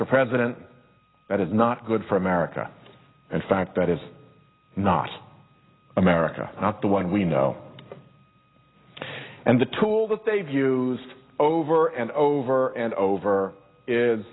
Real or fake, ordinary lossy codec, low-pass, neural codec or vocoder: real; AAC, 16 kbps; 7.2 kHz; none